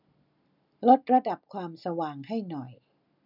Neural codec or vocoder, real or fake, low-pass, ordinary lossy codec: none; real; 5.4 kHz; none